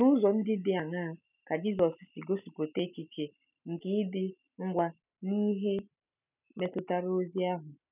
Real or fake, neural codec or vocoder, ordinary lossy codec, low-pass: real; none; none; 3.6 kHz